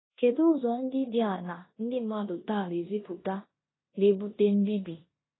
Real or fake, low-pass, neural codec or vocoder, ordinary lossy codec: fake; 7.2 kHz; codec, 16 kHz in and 24 kHz out, 0.9 kbps, LongCat-Audio-Codec, four codebook decoder; AAC, 16 kbps